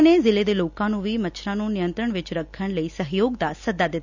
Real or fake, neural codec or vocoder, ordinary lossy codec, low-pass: real; none; none; 7.2 kHz